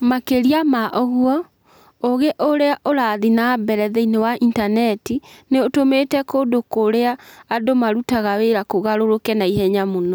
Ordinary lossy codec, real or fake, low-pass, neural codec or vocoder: none; real; none; none